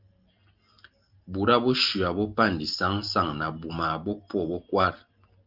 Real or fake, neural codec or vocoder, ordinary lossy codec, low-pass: real; none; Opus, 24 kbps; 5.4 kHz